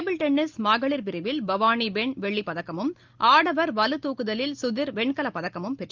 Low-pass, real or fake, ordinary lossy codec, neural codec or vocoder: 7.2 kHz; real; Opus, 32 kbps; none